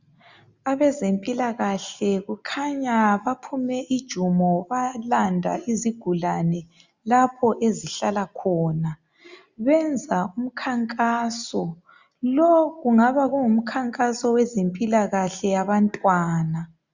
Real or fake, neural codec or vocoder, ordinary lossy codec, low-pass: real; none; Opus, 64 kbps; 7.2 kHz